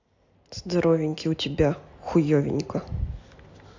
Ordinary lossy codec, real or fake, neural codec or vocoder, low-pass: AAC, 48 kbps; real; none; 7.2 kHz